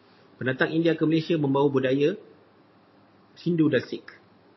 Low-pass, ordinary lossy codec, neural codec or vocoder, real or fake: 7.2 kHz; MP3, 24 kbps; none; real